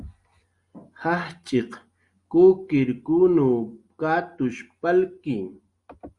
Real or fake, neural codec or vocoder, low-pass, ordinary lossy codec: real; none; 10.8 kHz; Opus, 64 kbps